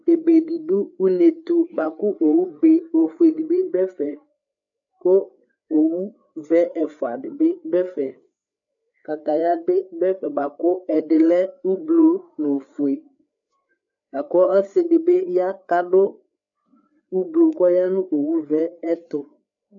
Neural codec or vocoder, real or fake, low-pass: codec, 16 kHz, 4 kbps, FreqCodec, larger model; fake; 7.2 kHz